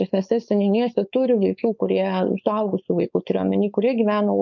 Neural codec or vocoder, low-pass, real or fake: codec, 16 kHz, 4.8 kbps, FACodec; 7.2 kHz; fake